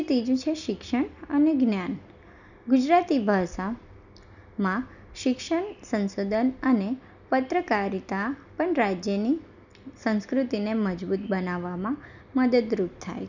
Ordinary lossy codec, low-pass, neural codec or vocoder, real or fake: none; 7.2 kHz; none; real